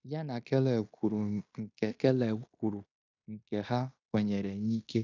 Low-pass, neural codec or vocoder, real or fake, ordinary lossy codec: 7.2 kHz; codec, 16 kHz in and 24 kHz out, 0.9 kbps, LongCat-Audio-Codec, fine tuned four codebook decoder; fake; none